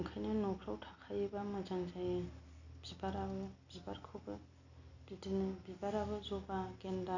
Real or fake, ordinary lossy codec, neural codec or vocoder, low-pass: real; none; none; 7.2 kHz